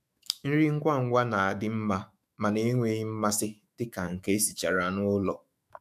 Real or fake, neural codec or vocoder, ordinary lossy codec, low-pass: fake; autoencoder, 48 kHz, 128 numbers a frame, DAC-VAE, trained on Japanese speech; none; 14.4 kHz